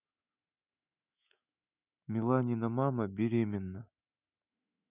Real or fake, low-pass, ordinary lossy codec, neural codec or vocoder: fake; 3.6 kHz; none; autoencoder, 48 kHz, 128 numbers a frame, DAC-VAE, trained on Japanese speech